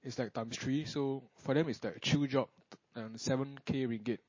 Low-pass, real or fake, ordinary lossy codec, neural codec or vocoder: 7.2 kHz; real; MP3, 32 kbps; none